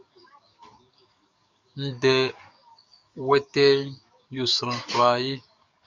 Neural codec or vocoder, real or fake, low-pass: codec, 44.1 kHz, 7.8 kbps, DAC; fake; 7.2 kHz